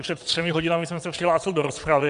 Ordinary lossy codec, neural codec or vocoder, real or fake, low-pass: MP3, 64 kbps; vocoder, 22.05 kHz, 80 mel bands, WaveNeXt; fake; 9.9 kHz